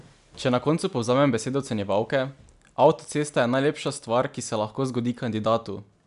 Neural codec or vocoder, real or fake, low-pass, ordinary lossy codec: none; real; 10.8 kHz; none